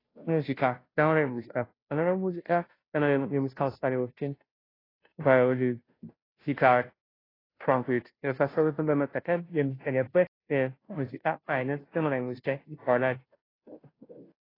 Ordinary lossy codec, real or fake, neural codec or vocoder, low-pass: AAC, 24 kbps; fake; codec, 16 kHz, 0.5 kbps, FunCodec, trained on Chinese and English, 25 frames a second; 5.4 kHz